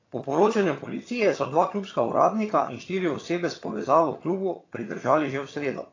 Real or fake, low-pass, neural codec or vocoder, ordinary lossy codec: fake; 7.2 kHz; vocoder, 22.05 kHz, 80 mel bands, HiFi-GAN; AAC, 32 kbps